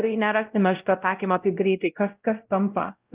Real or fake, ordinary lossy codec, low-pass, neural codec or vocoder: fake; Opus, 24 kbps; 3.6 kHz; codec, 16 kHz, 0.5 kbps, X-Codec, WavLM features, trained on Multilingual LibriSpeech